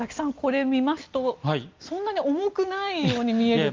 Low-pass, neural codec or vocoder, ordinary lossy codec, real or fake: 7.2 kHz; none; Opus, 24 kbps; real